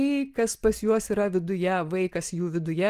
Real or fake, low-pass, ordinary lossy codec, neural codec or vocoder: real; 14.4 kHz; Opus, 24 kbps; none